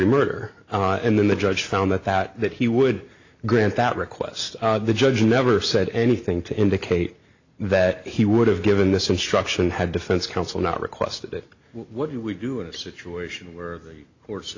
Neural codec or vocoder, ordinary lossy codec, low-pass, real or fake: none; AAC, 48 kbps; 7.2 kHz; real